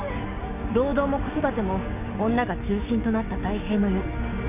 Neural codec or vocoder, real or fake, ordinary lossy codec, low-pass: codec, 16 kHz, 6 kbps, DAC; fake; none; 3.6 kHz